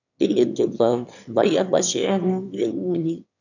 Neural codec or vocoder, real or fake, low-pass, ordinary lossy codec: autoencoder, 22.05 kHz, a latent of 192 numbers a frame, VITS, trained on one speaker; fake; 7.2 kHz; none